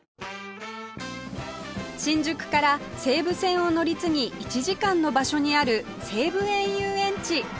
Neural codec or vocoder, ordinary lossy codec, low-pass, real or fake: none; none; none; real